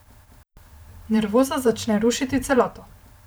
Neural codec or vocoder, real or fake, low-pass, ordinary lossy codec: vocoder, 44.1 kHz, 128 mel bands every 512 samples, BigVGAN v2; fake; none; none